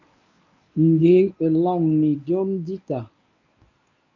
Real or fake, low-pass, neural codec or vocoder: fake; 7.2 kHz; codec, 24 kHz, 0.9 kbps, WavTokenizer, medium speech release version 1